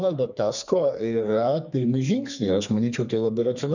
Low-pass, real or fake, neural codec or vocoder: 7.2 kHz; fake; codec, 32 kHz, 1.9 kbps, SNAC